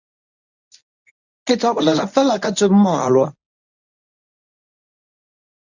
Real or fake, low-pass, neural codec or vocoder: fake; 7.2 kHz; codec, 24 kHz, 0.9 kbps, WavTokenizer, medium speech release version 1